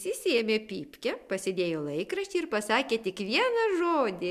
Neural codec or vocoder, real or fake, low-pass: none; real; 14.4 kHz